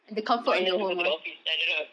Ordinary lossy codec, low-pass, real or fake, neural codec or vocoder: none; 9.9 kHz; real; none